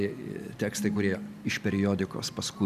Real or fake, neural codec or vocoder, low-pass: real; none; 14.4 kHz